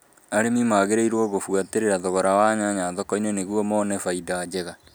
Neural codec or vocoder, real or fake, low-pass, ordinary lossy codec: none; real; none; none